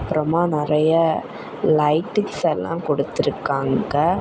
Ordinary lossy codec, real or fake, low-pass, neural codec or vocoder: none; real; none; none